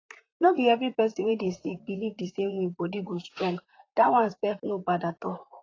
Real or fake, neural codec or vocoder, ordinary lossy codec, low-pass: fake; vocoder, 44.1 kHz, 128 mel bands, Pupu-Vocoder; AAC, 32 kbps; 7.2 kHz